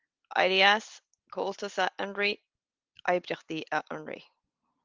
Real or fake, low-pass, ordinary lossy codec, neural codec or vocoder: real; 7.2 kHz; Opus, 32 kbps; none